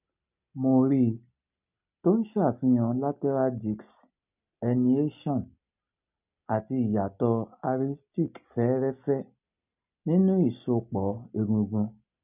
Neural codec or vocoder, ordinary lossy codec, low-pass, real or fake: none; none; 3.6 kHz; real